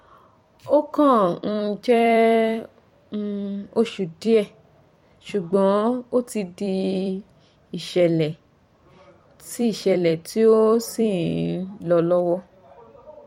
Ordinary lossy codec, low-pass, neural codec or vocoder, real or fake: MP3, 64 kbps; 19.8 kHz; vocoder, 44.1 kHz, 128 mel bands every 256 samples, BigVGAN v2; fake